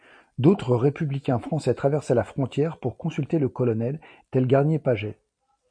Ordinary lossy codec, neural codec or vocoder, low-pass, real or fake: MP3, 48 kbps; vocoder, 44.1 kHz, 128 mel bands every 256 samples, BigVGAN v2; 9.9 kHz; fake